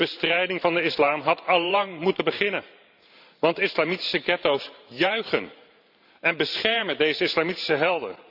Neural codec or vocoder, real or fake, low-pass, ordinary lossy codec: vocoder, 44.1 kHz, 128 mel bands every 512 samples, BigVGAN v2; fake; 5.4 kHz; none